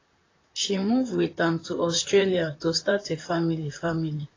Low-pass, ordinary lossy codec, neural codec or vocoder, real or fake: 7.2 kHz; AAC, 32 kbps; vocoder, 44.1 kHz, 128 mel bands, Pupu-Vocoder; fake